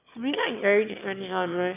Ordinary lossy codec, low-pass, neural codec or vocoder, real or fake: none; 3.6 kHz; autoencoder, 22.05 kHz, a latent of 192 numbers a frame, VITS, trained on one speaker; fake